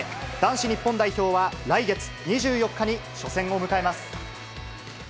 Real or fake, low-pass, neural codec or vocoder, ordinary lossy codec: real; none; none; none